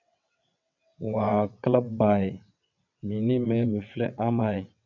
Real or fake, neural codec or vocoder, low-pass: fake; vocoder, 22.05 kHz, 80 mel bands, WaveNeXt; 7.2 kHz